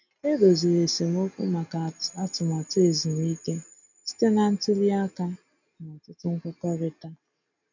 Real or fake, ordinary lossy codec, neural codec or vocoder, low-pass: real; none; none; 7.2 kHz